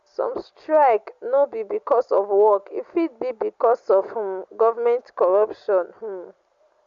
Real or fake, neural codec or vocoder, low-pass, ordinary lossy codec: real; none; 7.2 kHz; Opus, 64 kbps